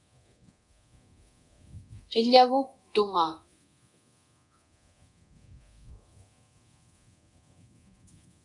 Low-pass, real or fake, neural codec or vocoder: 10.8 kHz; fake; codec, 24 kHz, 0.9 kbps, DualCodec